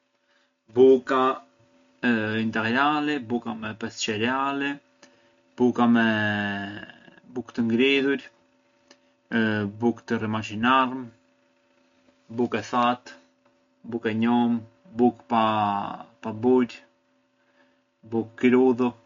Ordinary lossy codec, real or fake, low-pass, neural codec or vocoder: MP3, 48 kbps; real; 7.2 kHz; none